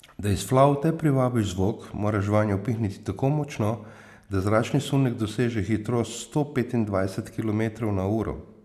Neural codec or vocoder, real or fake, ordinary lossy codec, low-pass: none; real; none; 14.4 kHz